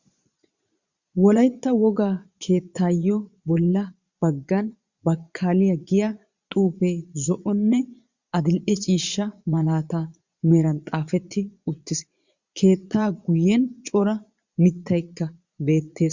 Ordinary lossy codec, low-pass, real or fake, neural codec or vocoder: Opus, 64 kbps; 7.2 kHz; fake; vocoder, 22.05 kHz, 80 mel bands, Vocos